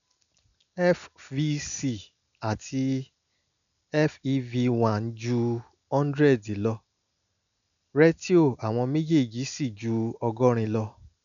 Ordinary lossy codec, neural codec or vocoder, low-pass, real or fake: none; none; 7.2 kHz; real